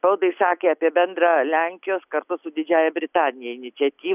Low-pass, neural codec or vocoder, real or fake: 3.6 kHz; none; real